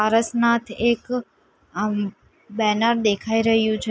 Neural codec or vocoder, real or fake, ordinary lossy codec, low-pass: none; real; none; none